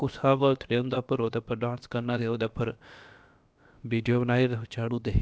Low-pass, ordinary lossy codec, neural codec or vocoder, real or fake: none; none; codec, 16 kHz, about 1 kbps, DyCAST, with the encoder's durations; fake